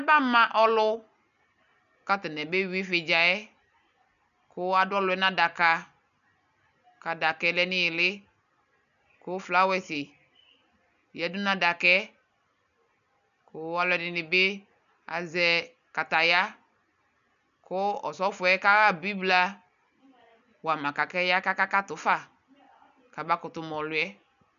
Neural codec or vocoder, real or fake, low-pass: none; real; 7.2 kHz